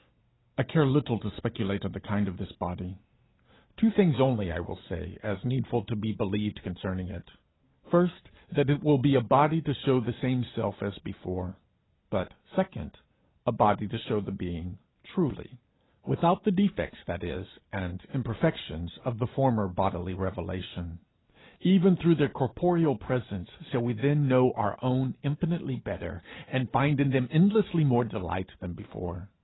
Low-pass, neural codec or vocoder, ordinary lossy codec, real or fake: 7.2 kHz; none; AAC, 16 kbps; real